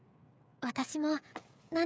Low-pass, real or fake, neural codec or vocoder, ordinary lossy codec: none; fake; codec, 16 kHz, 8 kbps, FreqCodec, smaller model; none